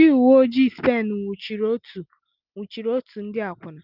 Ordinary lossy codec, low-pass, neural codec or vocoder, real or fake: Opus, 32 kbps; 5.4 kHz; none; real